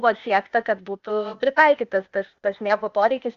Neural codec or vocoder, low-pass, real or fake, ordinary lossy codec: codec, 16 kHz, 0.8 kbps, ZipCodec; 7.2 kHz; fake; Opus, 64 kbps